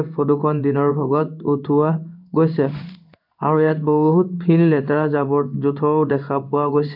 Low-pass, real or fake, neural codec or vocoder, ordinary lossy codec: 5.4 kHz; fake; codec, 16 kHz in and 24 kHz out, 1 kbps, XY-Tokenizer; none